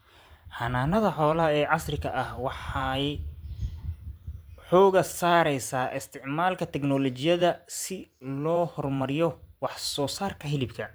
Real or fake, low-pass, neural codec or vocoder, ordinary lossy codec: fake; none; vocoder, 44.1 kHz, 128 mel bands every 512 samples, BigVGAN v2; none